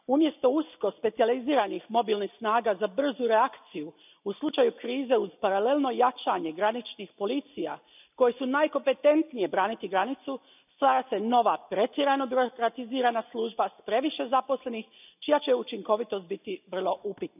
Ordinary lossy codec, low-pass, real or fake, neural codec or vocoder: none; 3.6 kHz; real; none